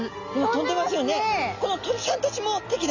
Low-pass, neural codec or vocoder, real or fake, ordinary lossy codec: 7.2 kHz; none; real; none